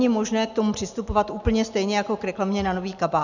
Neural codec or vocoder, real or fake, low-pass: none; real; 7.2 kHz